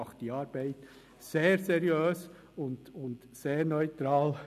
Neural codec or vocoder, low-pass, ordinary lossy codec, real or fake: none; 14.4 kHz; none; real